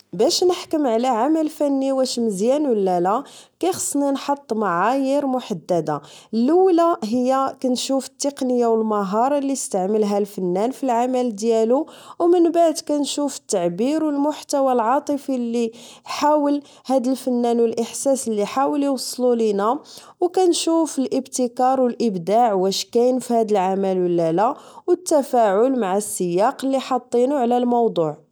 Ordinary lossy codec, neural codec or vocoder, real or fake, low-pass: none; none; real; none